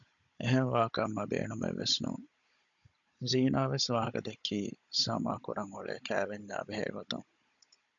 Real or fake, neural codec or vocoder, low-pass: fake; codec, 16 kHz, 16 kbps, FunCodec, trained on Chinese and English, 50 frames a second; 7.2 kHz